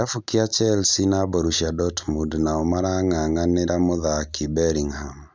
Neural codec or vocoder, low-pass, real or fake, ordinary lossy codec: none; none; real; none